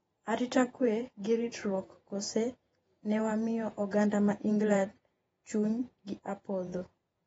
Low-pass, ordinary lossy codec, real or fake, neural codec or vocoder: 19.8 kHz; AAC, 24 kbps; fake; vocoder, 48 kHz, 128 mel bands, Vocos